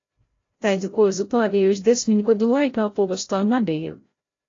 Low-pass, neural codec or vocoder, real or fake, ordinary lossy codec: 7.2 kHz; codec, 16 kHz, 0.5 kbps, FreqCodec, larger model; fake; AAC, 32 kbps